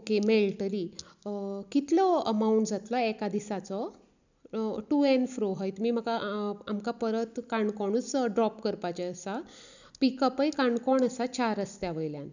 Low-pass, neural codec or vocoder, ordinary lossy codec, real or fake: 7.2 kHz; none; none; real